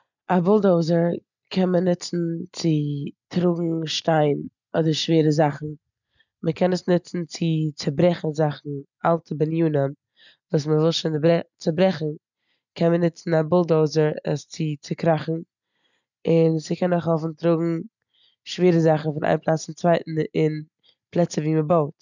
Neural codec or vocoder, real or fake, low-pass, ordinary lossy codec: none; real; 7.2 kHz; none